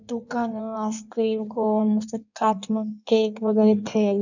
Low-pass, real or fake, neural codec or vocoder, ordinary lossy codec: 7.2 kHz; fake; codec, 16 kHz in and 24 kHz out, 1.1 kbps, FireRedTTS-2 codec; none